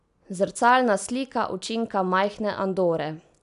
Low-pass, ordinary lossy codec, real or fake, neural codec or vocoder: 10.8 kHz; none; real; none